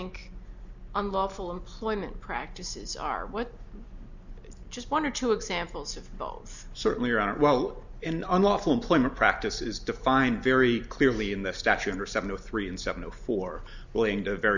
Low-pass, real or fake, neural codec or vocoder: 7.2 kHz; real; none